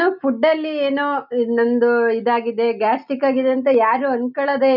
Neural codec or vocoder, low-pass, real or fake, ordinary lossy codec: none; 5.4 kHz; real; none